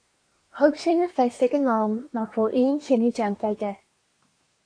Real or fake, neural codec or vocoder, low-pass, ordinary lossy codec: fake; codec, 24 kHz, 1 kbps, SNAC; 9.9 kHz; AAC, 48 kbps